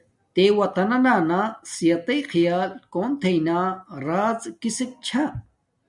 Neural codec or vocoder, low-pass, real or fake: none; 10.8 kHz; real